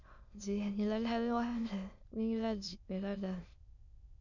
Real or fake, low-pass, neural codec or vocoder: fake; 7.2 kHz; autoencoder, 22.05 kHz, a latent of 192 numbers a frame, VITS, trained on many speakers